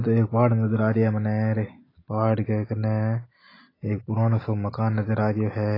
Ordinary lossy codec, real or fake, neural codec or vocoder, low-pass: AAC, 24 kbps; real; none; 5.4 kHz